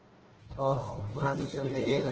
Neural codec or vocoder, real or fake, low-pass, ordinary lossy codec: codec, 16 kHz, 1 kbps, FunCodec, trained on Chinese and English, 50 frames a second; fake; 7.2 kHz; Opus, 16 kbps